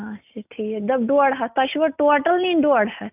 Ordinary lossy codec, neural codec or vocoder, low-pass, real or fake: none; none; 3.6 kHz; real